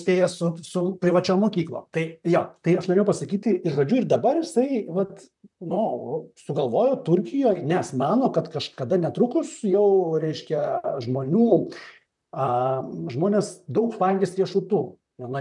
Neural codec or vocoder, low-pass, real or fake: vocoder, 44.1 kHz, 128 mel bands, Pupu-Vocoder; 10.8 kHz; fake